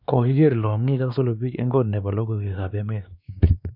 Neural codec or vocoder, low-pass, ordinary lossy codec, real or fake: codec, 16 kHz, 2 kbps, X-Codec, WavLM features, trained on Multilingual LibriSpeech; 5.4 kHz; none; fake